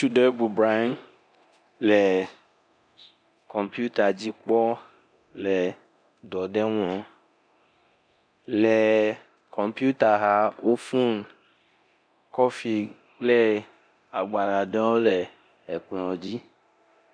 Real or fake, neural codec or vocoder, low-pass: fake; codec, 24 kHz, 0.9 kbps, DualCodec; 9.9 kHz